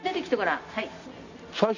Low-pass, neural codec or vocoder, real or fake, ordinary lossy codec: 7.2 kHz; none; real; Opus, 64 kbps